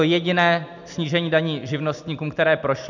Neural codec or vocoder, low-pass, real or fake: none; 7.2 kHz; real